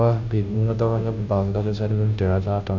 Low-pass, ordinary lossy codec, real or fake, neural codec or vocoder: 7.2 kHz; none; fake; codec, 16 kHz, 0.5 kbps, FunCodec, trained on Chinese and English, 25 frames a second